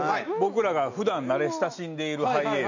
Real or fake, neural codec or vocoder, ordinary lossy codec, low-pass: real; none; none; 7.2 kHz